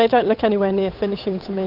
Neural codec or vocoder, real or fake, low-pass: codec, 16 kHz, 8 kbps, FunCodec, trained on Chinese and English, 25 frames a second; fake; 5.4 kHz